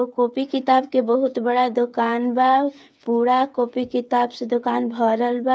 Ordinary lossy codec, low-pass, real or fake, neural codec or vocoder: none; none; fake; codec, 16 kHz, 8 kbps, FreqCodec, smaller model